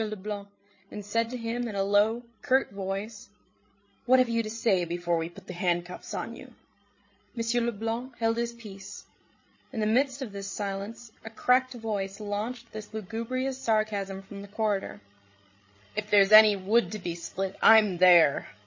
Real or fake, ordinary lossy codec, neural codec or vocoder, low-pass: fake; MP3, 32 kbps; codec, 16 kHz, 16 kbps, FreqCodec, larger model; 7.2 kHz